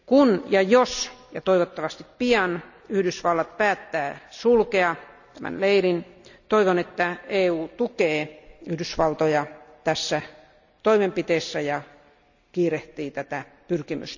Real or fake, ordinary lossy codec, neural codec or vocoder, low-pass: real; none; none; 7.2 kHz